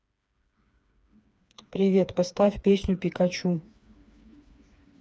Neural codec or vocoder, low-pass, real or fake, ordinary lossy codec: codec, 16 kHz, 4 kbps, FreqCodec, smaller model; none; fake; none